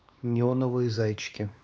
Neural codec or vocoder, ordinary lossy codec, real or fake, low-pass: codec, 16 kHz, 2 kbps, X-Codec, WavLM features, trained on Multilingual LibriSpeech; none; fake; none